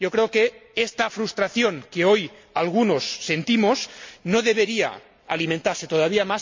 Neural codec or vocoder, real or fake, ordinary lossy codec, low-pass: none; real; MP3, 48 kbps; 7.2 kHz